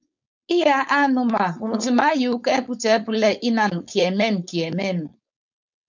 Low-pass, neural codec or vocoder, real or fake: 7.2 kHz; codec, 16 kHz, 4.8 kbps, FACodec; fake